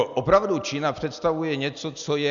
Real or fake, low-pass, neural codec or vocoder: real; 7.2 kHz; none